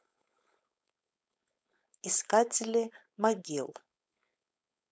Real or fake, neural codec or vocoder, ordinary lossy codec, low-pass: fake; codec, 16 kHz, 4.8 kbps, FACodec; none; none